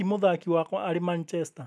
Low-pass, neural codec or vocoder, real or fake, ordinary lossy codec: none; none; real; none